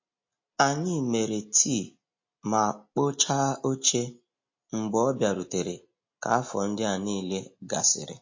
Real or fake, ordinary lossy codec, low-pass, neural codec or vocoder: real; MP3, 32 kbps; 7.2 kHz; none